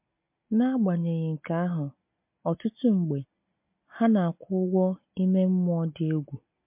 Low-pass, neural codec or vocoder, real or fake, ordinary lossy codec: 3.6 kHz; none; real; MP3, 32 kbps